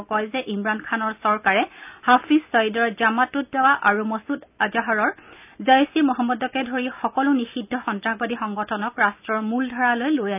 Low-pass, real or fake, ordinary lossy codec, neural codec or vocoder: 3.6 kHz; real; none; none